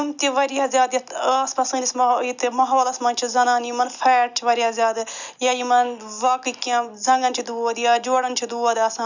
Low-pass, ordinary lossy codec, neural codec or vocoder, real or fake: 7.2 kHz; none; none; real